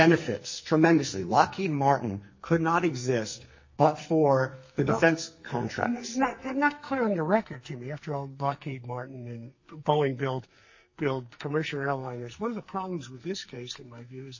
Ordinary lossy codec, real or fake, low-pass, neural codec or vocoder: MP3, 32 kbps; fake; 7.2 kHz; codec, 44.1 kHz, 2.6 kbps, SNAC